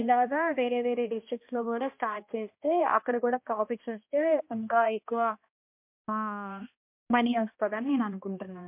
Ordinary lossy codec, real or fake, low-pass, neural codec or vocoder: MP3, 32 kbps; fake; 3.6 kHz; codec, 16 kHz, 1 kbps, X-Codec, HuBERT features, trained on balanced general audio